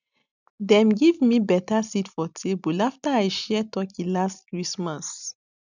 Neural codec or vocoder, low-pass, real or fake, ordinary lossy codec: none; 7.2 kHz; real; none